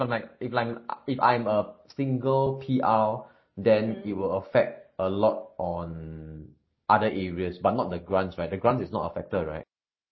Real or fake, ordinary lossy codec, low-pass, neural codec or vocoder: real; MP3, 24 kbps; 7.2 kHz; none